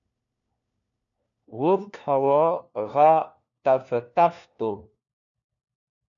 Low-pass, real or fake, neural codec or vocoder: 7.2 kHz; fake; codec, 16 kHz, 1 kbps, FunCodec, trained on LibriTTS, 50 frames a second